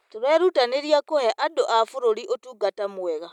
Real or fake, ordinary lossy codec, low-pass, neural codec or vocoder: real; none; 19.8 kHz; none